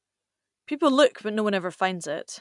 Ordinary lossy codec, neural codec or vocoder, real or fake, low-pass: none; none; real; 10.8 kHz